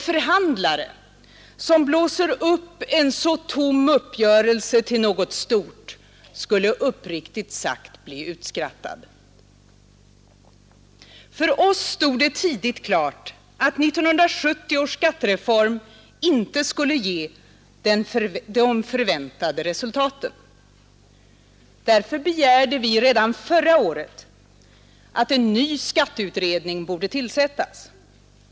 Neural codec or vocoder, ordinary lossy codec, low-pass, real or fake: none; none; none; real